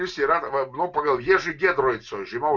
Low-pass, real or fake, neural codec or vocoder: 7.2 kHz; real; none